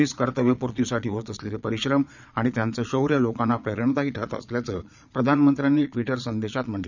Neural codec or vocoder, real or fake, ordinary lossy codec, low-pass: vocoder, 22.05 kHz, 80 mel bands, Vocos; fake; none; 7.2 kHz